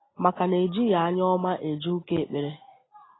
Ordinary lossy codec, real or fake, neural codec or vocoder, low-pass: AAC, 16 kbps; real; none; 7.2 kHz